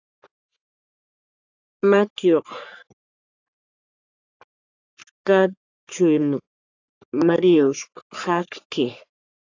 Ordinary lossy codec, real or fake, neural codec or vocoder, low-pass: AAC, 48 kbps; fake; codec, 44.1 kHz, 3.4 kbps, Pupu-Codec; 7.2 kHz